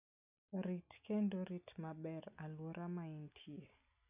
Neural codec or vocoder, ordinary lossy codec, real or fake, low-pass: none; none; real; 3.6 kHz